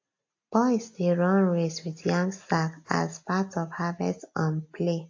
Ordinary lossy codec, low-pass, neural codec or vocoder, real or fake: AAC, 48 kbps; 7.2 kHz; none; real